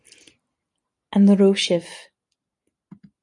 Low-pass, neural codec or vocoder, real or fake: 10.8 kHz; none; real